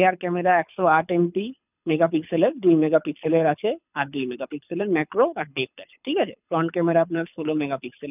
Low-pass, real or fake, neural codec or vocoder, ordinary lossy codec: 3.6 kHz; fake; codec, 24 kHz, 6 kbps, HILCodec; none